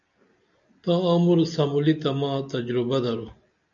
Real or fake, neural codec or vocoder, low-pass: real; none; 7.2 kHz